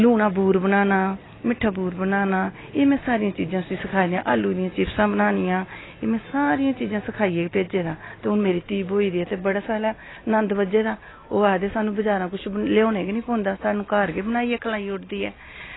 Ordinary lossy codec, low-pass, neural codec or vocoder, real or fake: AAC, 16 kbps; 7.2 kHz; none; real